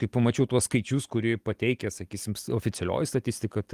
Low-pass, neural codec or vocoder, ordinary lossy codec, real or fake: 14.4 kHz; none; Opus, 24 kbps; real